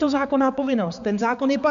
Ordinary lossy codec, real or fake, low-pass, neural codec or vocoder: MP3, 96 kbps; fake; 7.2 kHz; codec, 16 kHz, 4 kbps, X-Codec, HuBERT features, trained on balanced general audio